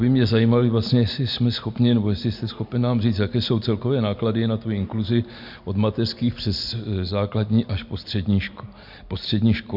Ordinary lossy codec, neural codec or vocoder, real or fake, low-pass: MP3, 48 kbps; none; real; 5.4 kHz